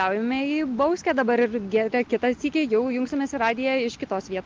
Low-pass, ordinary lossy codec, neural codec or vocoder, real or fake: 7.2 kHz; Opus, 32 kbps; none; real